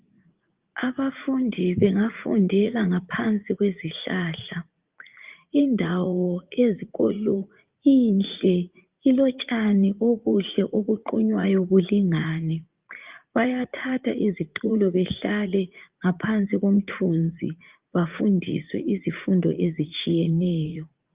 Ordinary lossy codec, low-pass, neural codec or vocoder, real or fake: Opus, 32 kbps; 3.6 kHz; vocoder, 44.1 kHz, 80 mel bands, Vocos; fake